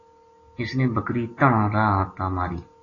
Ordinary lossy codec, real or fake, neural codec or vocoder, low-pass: AAC, 32 kbps; real; none; 7.2 kHz